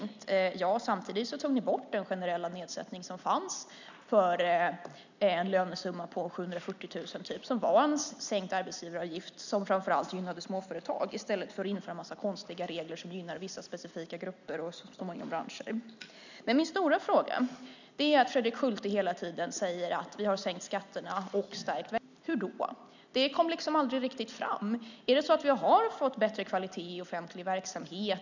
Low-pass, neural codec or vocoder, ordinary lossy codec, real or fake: 7.2 kHz; none; none; real